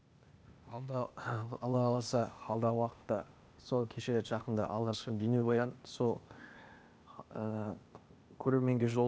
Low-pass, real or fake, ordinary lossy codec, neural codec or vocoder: none; fake; none; codec, 16 kHz, 0.8 kbps, ZipCodec